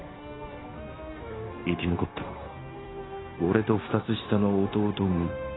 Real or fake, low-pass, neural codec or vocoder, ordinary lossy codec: fake; 7.2 kHz; codec, 16 kHz, 0.9 kbps, LongCat-Audio-Codec; AAC, 16 kbps